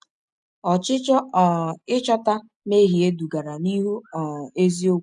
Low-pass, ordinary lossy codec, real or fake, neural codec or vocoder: 10.8 kHz; none; real; none